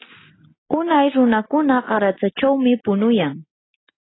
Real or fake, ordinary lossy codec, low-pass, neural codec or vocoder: real; AAC, 16 kbps; 7.2 kHz; none